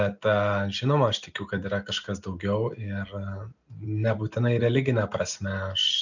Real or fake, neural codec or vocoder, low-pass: real; none; 7.2 kHz